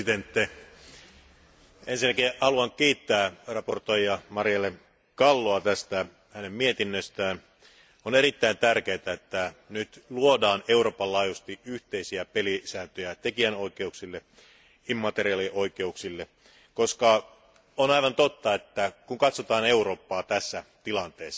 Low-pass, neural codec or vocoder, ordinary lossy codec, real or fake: none; none; none; real